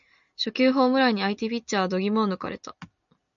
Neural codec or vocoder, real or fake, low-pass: none; real; 7.2 kHz